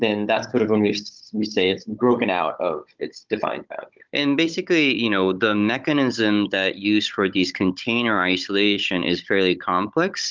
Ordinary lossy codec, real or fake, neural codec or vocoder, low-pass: Opus, 24 kbps; fake; codec, 16 kHz, 16 kbps, FunCodec, trained on Chinese and English, 50 frames a second; 7.2 kHz